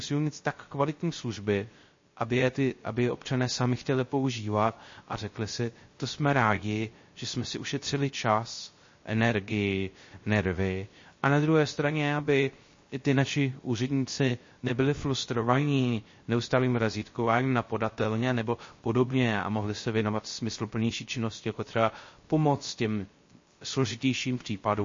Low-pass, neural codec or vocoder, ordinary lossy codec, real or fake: 7.2 kHz; codec, 16 kHz, 0.3 kbps, FocalCodec; MP3, 32 kbps; fake